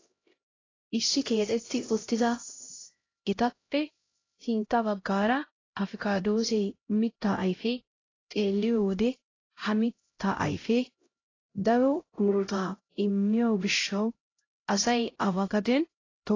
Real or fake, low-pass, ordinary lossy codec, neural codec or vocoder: fake; 7.2 kHz; AAC, 32 kbps; codec, 16 kHz, 0.5 kbps, X-Codec, HuBERT features, trained on LibriSpeech